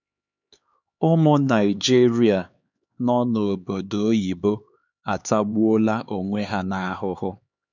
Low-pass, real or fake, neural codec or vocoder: 7.2 kHz; fake; codec, 16 kHz, 4 kbps, X-Codec, HuBERT features, trained on LibriSpeech